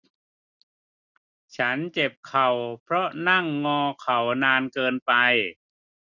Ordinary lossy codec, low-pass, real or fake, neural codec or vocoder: none; 7.2 kHz; real; none